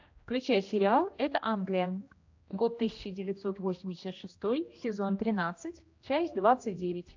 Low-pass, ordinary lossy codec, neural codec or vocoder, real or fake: 7.2 kHz; AAC, 48 kbps; codec, 16 kHz, 1 kbps, X-Codec, HuBERT features, trained on general audio; fake